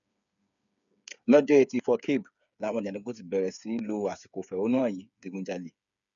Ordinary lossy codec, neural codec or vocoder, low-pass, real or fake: none; codec, 16 kHz, 8 kbps, FreqCodec, smaller model; 7.2 kHz; fake